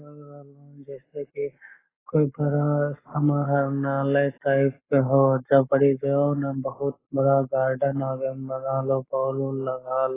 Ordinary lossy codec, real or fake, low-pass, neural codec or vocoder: AAC, 16 kbps; fake; 3.6 kHz; codec, 44.1 kHz, 7.8 kbps, Pupu-Codec